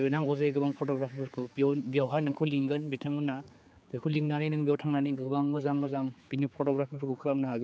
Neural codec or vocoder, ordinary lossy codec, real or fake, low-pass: codec, 16 kHz, 4 kbps, X-Codec, HuBERT features, trained on general audio; none; fake; none